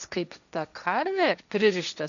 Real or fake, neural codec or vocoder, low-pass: fake; codec, 16 kHz, 1.1 kbps, Voila-Tokenizer; 7.2 kHz